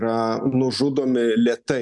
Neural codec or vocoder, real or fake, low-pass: none; real; 10.8 kHz